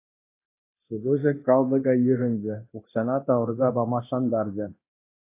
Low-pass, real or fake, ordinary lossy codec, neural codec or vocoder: 3.6 kHz; fake; AAC, 24 kbps; codec, 24 kHz, 0.9 kbps, DualCodec